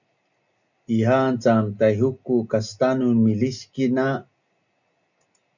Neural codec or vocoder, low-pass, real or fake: none; 7.2 kHz; real